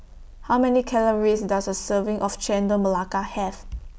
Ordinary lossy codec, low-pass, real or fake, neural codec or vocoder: none; none; real; none